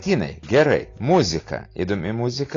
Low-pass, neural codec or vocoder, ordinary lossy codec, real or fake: 7.2 kHz; none; AAC, 32 kbps; real